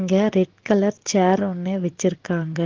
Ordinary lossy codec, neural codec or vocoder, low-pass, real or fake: Opus, 16 kbps; none; 7.2 kHz; real